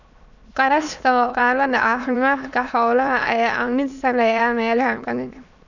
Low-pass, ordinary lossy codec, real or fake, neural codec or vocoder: 7.2 kHz; none; fake; autoencoder, 22.05 kHz, a latent of 192 numbers a frame, VITS, trained on many speakers